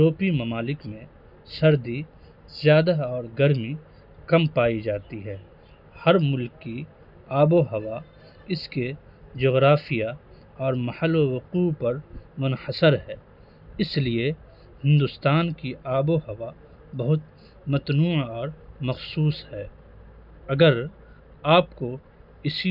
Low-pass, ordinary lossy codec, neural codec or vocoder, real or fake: 5.4 kHz; none; none; real